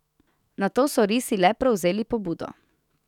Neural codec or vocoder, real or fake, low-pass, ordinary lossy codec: autoencoder, 48 kHz, 128 numbers a frame, DAC-VAE, trained on Japanese speech; fake; 19.8 kHz; none